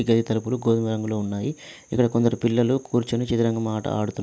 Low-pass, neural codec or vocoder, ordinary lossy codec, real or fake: none; none; none; real